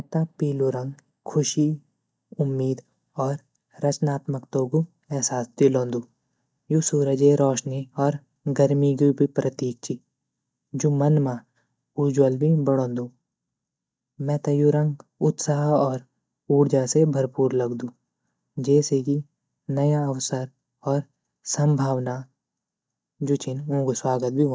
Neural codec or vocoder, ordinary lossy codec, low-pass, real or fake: none; none; none; real